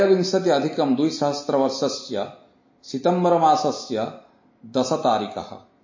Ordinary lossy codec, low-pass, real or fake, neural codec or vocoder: MP3, 32 kbps; 7.2 kHz; real; none